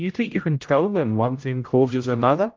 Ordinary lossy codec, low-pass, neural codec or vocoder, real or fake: Opus, 32 kbps; 7.2 kHz; codec, 16 kHz, 0.5 kbps, X-Codec, HuBERT features, trained on general audio; fake